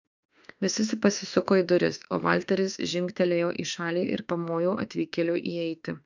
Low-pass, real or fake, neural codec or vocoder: 7.2 kHz; fake; autoencoder, 48 kHz, 32 numbers a frame, DAC-VAE, trained on Japanese speech